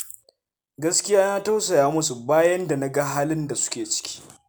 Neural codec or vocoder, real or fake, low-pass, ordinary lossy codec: none; real; none; none